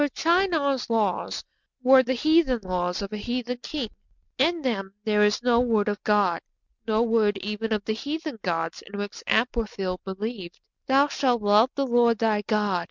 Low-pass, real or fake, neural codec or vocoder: 7.2 kHz; real; none